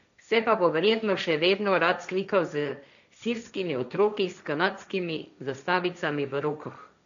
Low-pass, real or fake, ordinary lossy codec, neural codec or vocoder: 7.2 kHz; fake; none; codec, 16 kHz, 1.1 kbps, Voila-Tokenizer